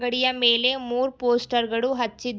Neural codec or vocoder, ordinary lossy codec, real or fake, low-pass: none; none; real; none